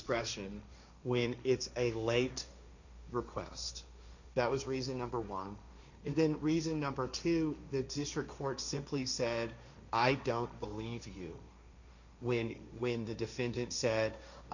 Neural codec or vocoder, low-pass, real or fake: codec, 16 kHz, 1.1 kbps, Voila-Tokenizer; 7.2 kHz; fake